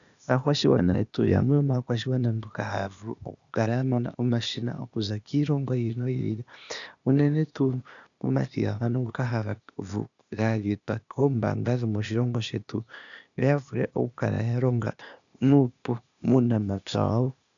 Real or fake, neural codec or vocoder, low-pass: fake; codec, 16 kHz, 0.8 kbps, ZipCodec; 7.2 kHz